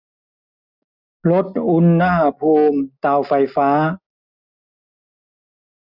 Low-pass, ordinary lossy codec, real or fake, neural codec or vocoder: 5.4 kHz; none; real; none